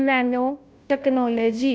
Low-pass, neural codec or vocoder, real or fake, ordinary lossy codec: none; codec, 16 kHz, 0.5 kbps, FunCodec, trained on Chinese and English, 25 frames a second; fake; none